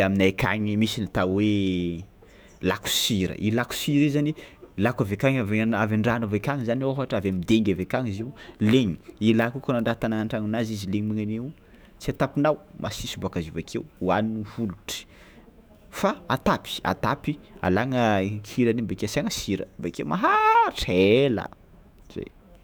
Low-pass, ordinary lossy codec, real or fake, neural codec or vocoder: none; none; fake; autoencoder, 48 kHz, 128 numbers a frame, DAC-VAE, trained on Japanese speech